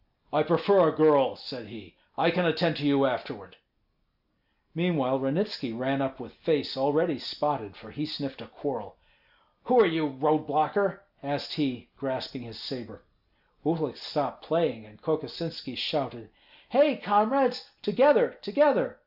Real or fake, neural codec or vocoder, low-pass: real; none; 5.4 kHz